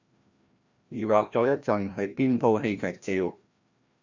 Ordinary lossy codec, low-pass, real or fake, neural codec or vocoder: Opus, 64 kbps; 7.2 kHz; fake; codec, 16 kHz, 1 kbps, FreqCodec, larger model